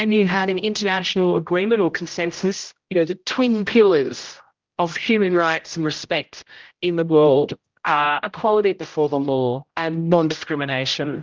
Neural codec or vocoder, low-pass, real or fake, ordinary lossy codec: codec, 16 kHz, 0.5 kbps, X-Codec, HuBERT features, trained on general audio; 7.2 kHz; fake; Opus, 32 kbps